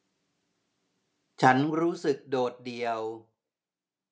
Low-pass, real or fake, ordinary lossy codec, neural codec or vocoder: none; real; none; none